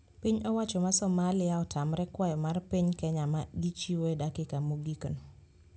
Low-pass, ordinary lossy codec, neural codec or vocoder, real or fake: none; none; none; real